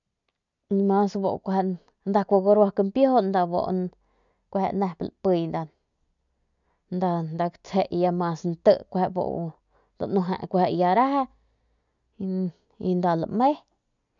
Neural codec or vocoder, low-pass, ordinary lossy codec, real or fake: none; 7.2 kHz; none; real